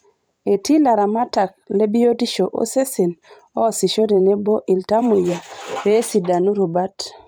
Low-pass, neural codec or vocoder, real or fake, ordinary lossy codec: none; vocoder, 44.1 kHz, 128 mel bands every 512 samples, BigVGAN v2; fake; none